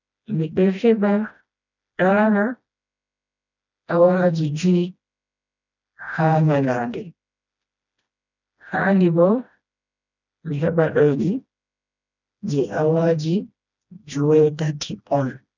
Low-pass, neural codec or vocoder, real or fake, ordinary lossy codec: 7.2 kHz; codec, 16 kHz, 1 kbps, FreqCodec, smaller model; fake; none